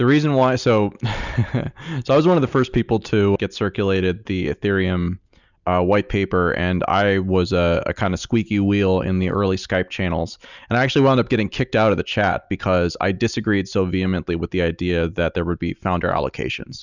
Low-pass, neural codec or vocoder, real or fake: 7.2 kHz; none; real